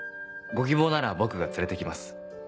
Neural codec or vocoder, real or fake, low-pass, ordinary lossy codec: none; real; none; none